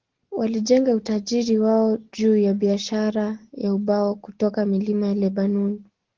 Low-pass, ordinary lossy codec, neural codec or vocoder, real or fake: 7.2 kHz; Opus, 16 kbps; none; real